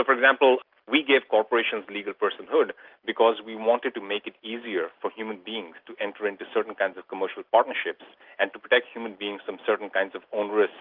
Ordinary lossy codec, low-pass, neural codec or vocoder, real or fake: Opus, 24 kbps; 5.4 kHz; none; real